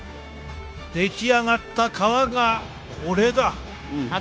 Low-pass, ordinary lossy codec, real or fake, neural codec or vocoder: none; none; fake; codec, 16 kHz, 0.9 kbps, LongCat-Audio-Codec